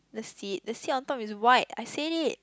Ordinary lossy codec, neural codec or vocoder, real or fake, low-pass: none; none; real; none